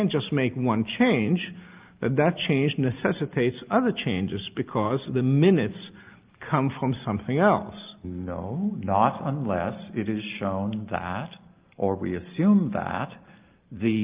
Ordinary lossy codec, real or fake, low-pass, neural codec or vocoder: Opus, 24 kbps; real; 3.6 kHz; none